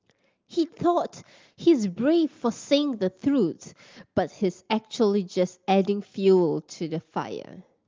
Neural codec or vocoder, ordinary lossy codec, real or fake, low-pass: none; Opus, 32 kbps; real; 7.2 kHz